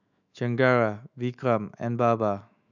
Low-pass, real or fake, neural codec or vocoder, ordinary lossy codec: 7.2 kHz; fake; autoencoder, 48 kHz, 128 numbers a frame, DAC-VAE, trained on Japanese speech; none